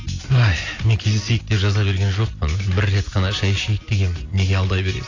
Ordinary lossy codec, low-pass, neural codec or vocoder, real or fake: AAC, 32 kbps; 7.2 kHz; none; real